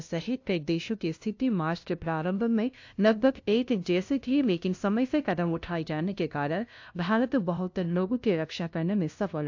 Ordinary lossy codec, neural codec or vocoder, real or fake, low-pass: AAC, 48 kbps; codec, 16 kHz, 0.5 kbps, FunCodec, trained on LibriTTS, 25 frames a second; fake; 7.2 kHz